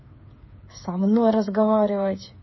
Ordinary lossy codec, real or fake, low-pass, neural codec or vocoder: MP3, 24 kbps; fake; 7.2 kHz; codec, 16 kHz, 8 kbps, FreqCodec, smaller model